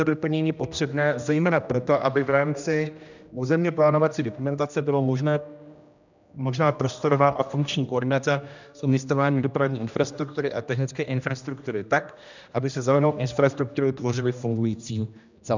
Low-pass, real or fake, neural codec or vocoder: 7.2 kHz; fake; codec, 16 kHz, 1 kbps, X-Codec, HuBERT features, trained on general audio